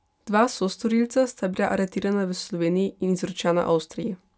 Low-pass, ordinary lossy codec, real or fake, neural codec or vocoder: none; none; real; none